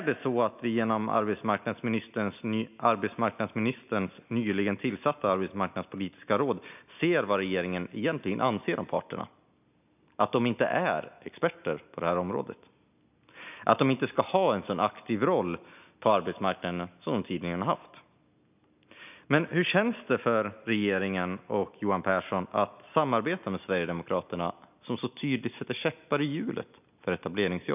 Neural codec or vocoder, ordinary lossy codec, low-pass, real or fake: none; none; 3.6 kHz; real